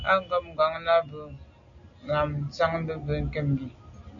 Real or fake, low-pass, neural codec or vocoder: real; 7.2 kHz; none